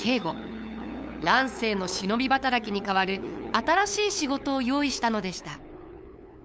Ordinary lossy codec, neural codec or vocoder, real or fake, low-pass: none; codec, 16 kHz, 8 kbps, FunCodec, trained on LibriTTS, 25 frames a second; fake; none